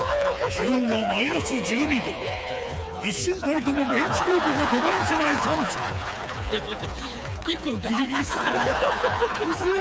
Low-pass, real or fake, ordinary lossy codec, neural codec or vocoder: none; fake; none; codec, 16 kHz, 4 kbps, FreqCodec, smaller model